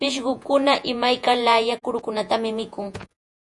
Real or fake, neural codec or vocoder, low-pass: fake; vocoder, 48 kHz, 128 mel bands, Vocos; 10.8 kHz